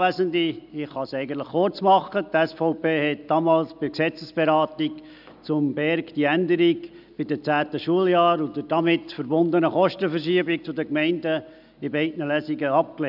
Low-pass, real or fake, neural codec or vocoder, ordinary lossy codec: 5.4 kHz; real; none; none